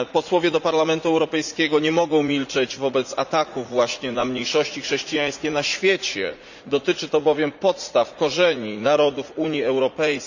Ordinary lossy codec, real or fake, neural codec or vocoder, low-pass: none; fake; vocoder, 44.1 kHz, 80 mel bands, Vocos; 7.2 kHz